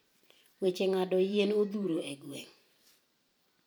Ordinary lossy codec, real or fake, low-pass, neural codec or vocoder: none; fake; none; vocoder, 44.1 kHz, 128 mel bands every 512 samples, BigVGAN v2